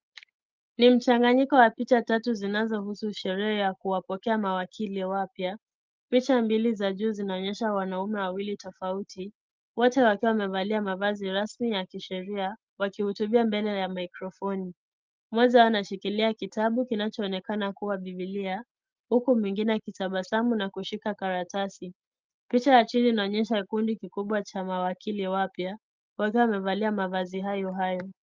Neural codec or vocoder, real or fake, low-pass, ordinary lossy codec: none; real; 7.2 kHz; Opus, 24 kbps